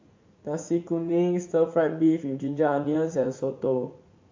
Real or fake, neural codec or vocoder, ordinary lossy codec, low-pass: fake; vocoder, 44.1 kHz, 80 mel bands, Vocos; MP3, 48 kbps; 7.2 kHz